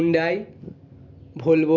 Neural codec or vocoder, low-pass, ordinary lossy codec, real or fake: none; 7.2 kHz; none; real